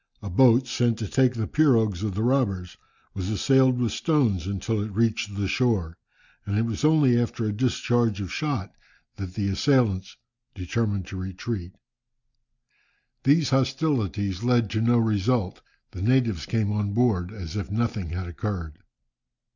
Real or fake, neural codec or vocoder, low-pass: real; none; 7.2 kHz